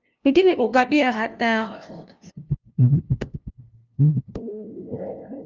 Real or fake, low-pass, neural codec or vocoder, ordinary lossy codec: fake; 7.2 kHz; codec, 16 kHz, 0.5 kbps, FunCodec, trained on LibriTTS, 25 frames a second; Opus, 32 kbps